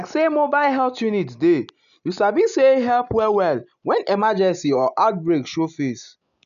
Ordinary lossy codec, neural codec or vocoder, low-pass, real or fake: none; none; 7.2 kHz; real